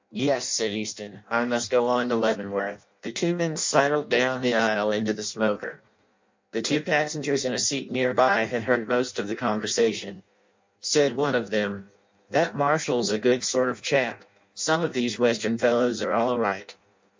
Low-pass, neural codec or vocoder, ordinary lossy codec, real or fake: 7.2 kHz; codec, 16 kHz in and 24 kHz out, 0.6 kbps, FireRedTTS-2 codec; MP3, 64 kbps; fake